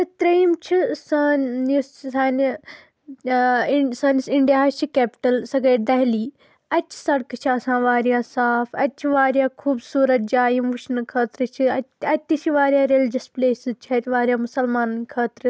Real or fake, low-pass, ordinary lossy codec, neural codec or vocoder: real; none; none; none